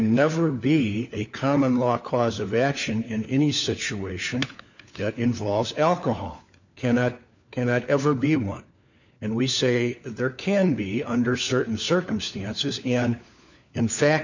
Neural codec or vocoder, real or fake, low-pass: codec, 16 kHz, 4 kbps, FunCodec, trained on LibriTTS, 50 frames a second; fake; 7.2 kHz